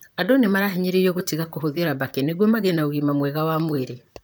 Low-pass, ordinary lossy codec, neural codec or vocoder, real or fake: none; none; vocoder, 44.1 kHz, 128 mel bands, Pupu-Vocoder; fake